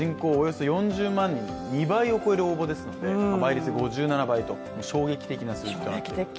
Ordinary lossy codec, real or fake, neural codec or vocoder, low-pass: none; real; none; none